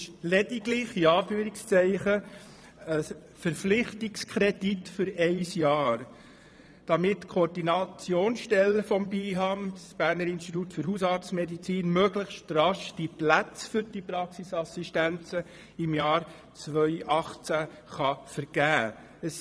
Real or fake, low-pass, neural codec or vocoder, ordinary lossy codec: fake; none; vocoder, 22.05 kHz, 80 mel bands, Vocos; none